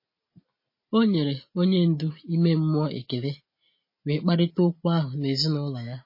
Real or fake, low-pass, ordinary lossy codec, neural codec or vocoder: real; 5.4 kHz; MP3, 24 kbps; none